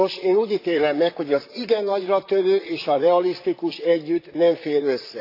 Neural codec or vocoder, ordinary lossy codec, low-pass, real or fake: vocoder, 44.1 kHz, 128 mel bands, Pupu-Vocoder; AAC, 32 kbps; 5.4 kHz; fake